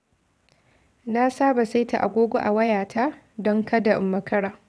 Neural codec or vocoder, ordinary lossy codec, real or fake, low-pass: vocoder, 22.05 kHz, 80 mel bands, WaveNeXt; none; fake; none